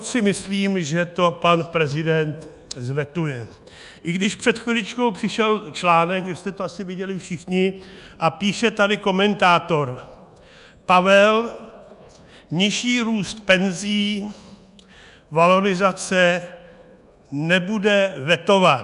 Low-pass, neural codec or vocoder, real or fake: 10.8 kHz; codec, 24 kHz, 1.2 kbps, DualCodec; fake